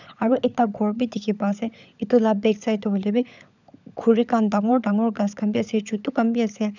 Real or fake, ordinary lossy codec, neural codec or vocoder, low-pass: fake; none; codec, 16 kHz, 16 kbps, FunCodec, trained on LibriTTS, 50 frames a second; 7.2 kHz